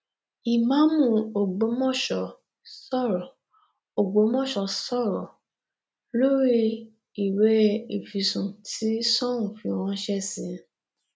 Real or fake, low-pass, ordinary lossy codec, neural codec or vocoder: real; none; none; none